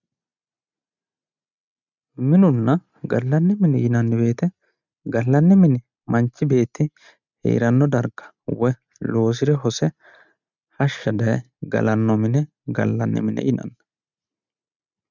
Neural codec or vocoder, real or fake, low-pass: none; real; 7.2 kHz